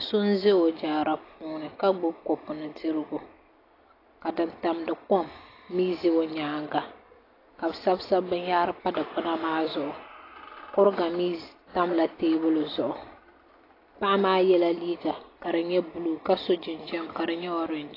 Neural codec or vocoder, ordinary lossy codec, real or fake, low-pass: none; AAC, 24 kbps; real; 5.4 kHz